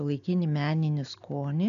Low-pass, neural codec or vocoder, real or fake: 7.2 kHz; none; real